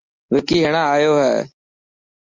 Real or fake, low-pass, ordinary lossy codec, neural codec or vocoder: real; 7.2 kHz; Opus, 32 kbps; none